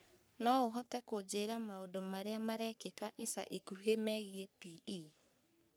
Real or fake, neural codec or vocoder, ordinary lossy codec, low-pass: fake; codec, 44.1 kHz, 3.4 kbps, Pupu-Codec; none; none